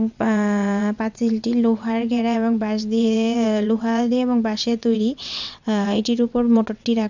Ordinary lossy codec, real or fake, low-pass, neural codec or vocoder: none; fake; 7.2 kHz; vocoder, 22.05 kHz, 80 mel bands, WaveNeXt